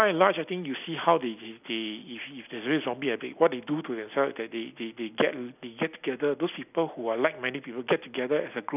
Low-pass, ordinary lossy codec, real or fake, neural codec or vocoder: 3.6 kHz; none; real; none